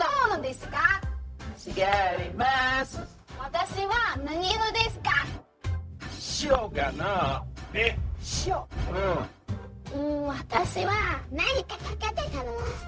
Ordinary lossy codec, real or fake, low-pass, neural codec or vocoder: none; fake; none; codec, 16 kHz, 0.4 kbps, LongCat-Audio-Codec